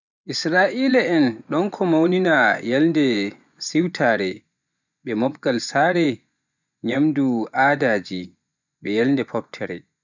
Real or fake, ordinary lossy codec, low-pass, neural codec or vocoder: fake; none; 7.2 kHz; vocoder, 24 kHz, 100 mel bands, Vocos